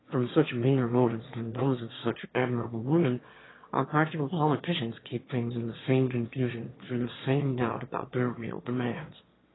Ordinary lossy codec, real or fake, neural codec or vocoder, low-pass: AAC, 16 kbps; fake; autoencoder, 22.05 kHz, a latent of 192 numbers a frame, VITS, trained on one speaker; 7.2 kHz